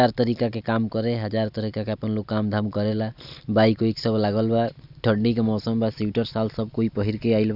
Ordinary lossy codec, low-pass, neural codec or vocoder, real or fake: none; 5.4 kHz; none; real